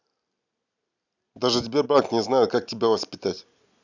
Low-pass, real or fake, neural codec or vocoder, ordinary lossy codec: 7.2 kHz; real; none; none